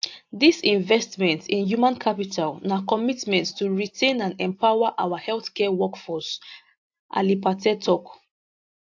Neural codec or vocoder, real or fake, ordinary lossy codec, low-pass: none; real; AAC, 48 kbps; 7.2 kHz